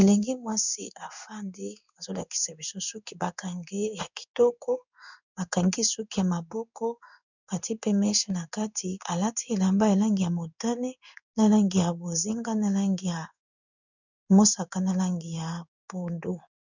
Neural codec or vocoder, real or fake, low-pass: codec, 16 kHz in and 24 kHz out, 1 kbps, XY-Tokenizer; fake; 7.2 kHz